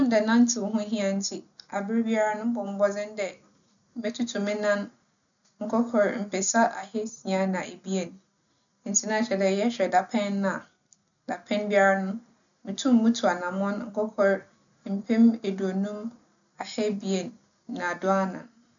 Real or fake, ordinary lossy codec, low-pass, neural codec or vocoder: real; MP3, 96 kbps; 7.2 kHz; none